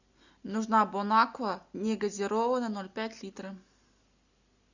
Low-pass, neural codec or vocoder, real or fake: 7.2 kHz; none; real